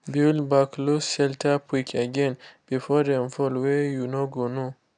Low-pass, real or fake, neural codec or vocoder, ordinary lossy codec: 9.9 kHz; real; none; none